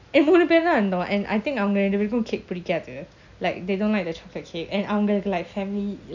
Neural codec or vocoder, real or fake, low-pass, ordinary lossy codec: none; real; 7.2 kHz; none